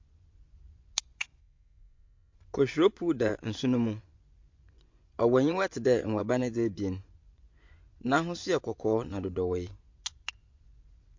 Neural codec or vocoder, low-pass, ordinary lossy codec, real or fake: vocoder, 24 kHz, 100 mel bands, Vocos; 7.2 kHz; MP3, 48 kbps; fake